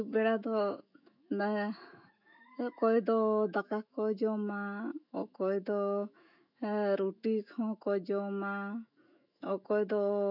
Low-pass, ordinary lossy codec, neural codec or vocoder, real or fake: 5.4 kHz; none; autoencoder, 48 kHz, 128 numbers a frame, DAC-VAE, trained on Japanese speech; fake